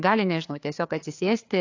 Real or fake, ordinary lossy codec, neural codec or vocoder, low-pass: fake; AAC, 48 kbps; codec, 16 kHz, 4 kbps, FreqCodec, larger model; 7.2 kHz